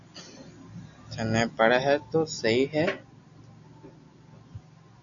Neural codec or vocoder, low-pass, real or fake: none; 7.2 kHz; real